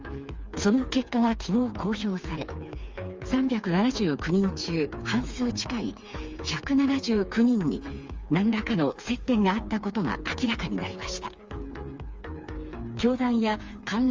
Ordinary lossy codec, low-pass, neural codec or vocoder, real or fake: Opus, 32 kbps; 7.2 kHz; codec, 16 kHz, 4 kbps, FreqCodec, smaller model; fake